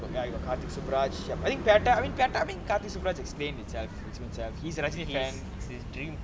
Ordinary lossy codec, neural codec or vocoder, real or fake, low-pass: none; none; real; none